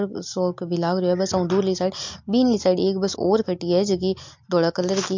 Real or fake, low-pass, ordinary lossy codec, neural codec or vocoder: real; 7.2 kHz; MP3, 48 kbps; none